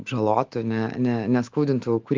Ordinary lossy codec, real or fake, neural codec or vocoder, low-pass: Opus, 32 kbps; fake; autoencoder, 48 kHz, 128 numbers a frame, DAC-VAE, trained on Japanese speech; 7.2 kHz